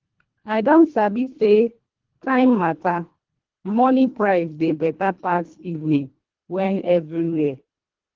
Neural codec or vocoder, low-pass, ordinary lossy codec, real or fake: codec, 24 kHz, 1.5 kbps, HILCodec; 7.2 kHz; Opus, 16 kbps; fake